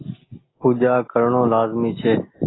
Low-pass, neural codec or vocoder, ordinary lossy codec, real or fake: 7.2 kHz; none; AAC, 16 kbps; real